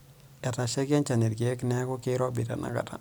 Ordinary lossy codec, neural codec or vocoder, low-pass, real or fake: none; none; none; real